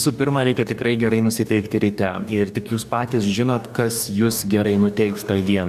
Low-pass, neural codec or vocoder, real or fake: 14.4 kHz; codec, 44.1 kHz, 2.6 kbps, DAC; fake